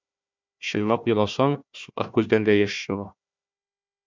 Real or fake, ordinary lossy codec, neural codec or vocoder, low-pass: fake; MP3, 64 kbps; codec, 16 kHz, 1 kbps, FunCodec, trained on Chinese and English, 50 frames a second; 7.2 kHz